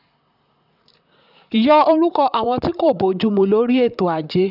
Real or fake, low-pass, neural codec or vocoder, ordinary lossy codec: fake; 5.4 kHz; vocoder, 44.1 kHz, 128 mel bands, Pupu-Vocoder; none